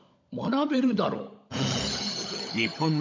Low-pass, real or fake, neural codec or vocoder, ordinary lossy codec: 7.2 kHz; fake; codec, 16 kHz, 16 kbps, FunCodec, trained on LibriTTS, 50 frames a second; MP3, 64 kbps